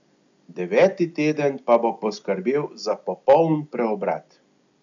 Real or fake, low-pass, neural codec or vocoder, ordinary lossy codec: real; 7.2 kHz; none; MP3, 96 kbps